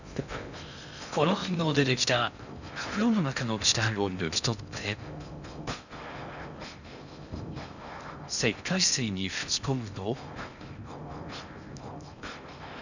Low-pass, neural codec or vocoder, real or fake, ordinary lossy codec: 7.2 kHz; codec, 16 kHz in and 24 kHz out, 0.6 kbps, FocalCodec, streaming, 2048 codes; fake; none